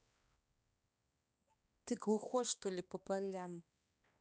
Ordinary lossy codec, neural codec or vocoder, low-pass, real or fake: none; codec, 16 kHz, 2 kbps, X-Codec, HuBERT features, trained on balanced general audio; none; fake